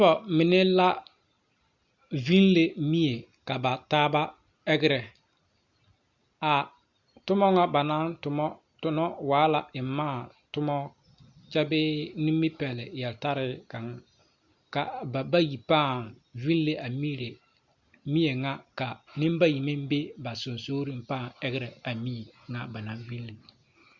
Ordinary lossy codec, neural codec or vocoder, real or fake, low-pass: Opus, 64 kbps; none; real; 7.2 kHz